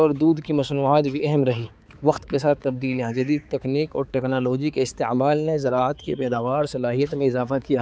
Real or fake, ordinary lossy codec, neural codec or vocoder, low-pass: fake; none; codec, 16 kHz, 4 kbps, X-Codec, HuBERT features, trained on balanced general audio; none